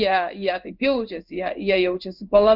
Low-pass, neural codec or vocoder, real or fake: 5.4 kHz; codec, 16 kHz in and 24 kHz out, 1 kbps, XY-Tokenizer; fake